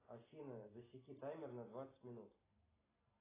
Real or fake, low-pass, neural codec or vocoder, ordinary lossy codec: real; 3.6 kHz; none; AAC, 16 kbps